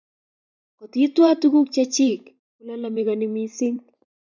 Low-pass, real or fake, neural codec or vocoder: 7.2 kHz; fake; vocoder, 44.1 kHz, 128 mel bands every 512 samples, BigVGAN v2